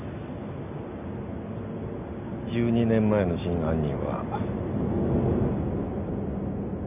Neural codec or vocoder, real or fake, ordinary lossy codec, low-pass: none; real; none; 3.6 kHz